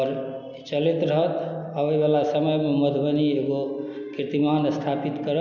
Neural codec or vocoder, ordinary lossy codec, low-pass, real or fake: none; none; 7.2 kHz; real